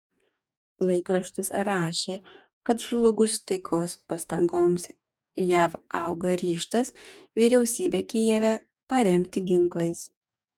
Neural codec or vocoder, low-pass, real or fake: codec, 44.1 kHz, 2.6 kbps, DAC; 19.8 kHz; fake